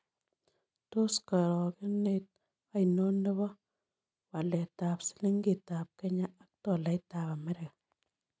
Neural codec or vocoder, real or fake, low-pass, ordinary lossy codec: none; real; none; none